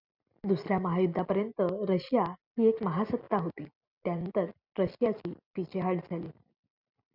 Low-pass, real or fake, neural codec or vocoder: 5.4 kHz; real; none